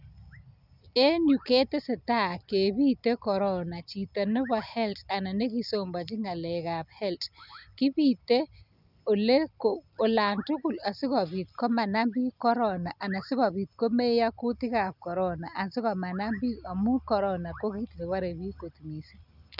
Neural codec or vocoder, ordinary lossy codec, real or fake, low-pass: none; none; real; 5.4 kHz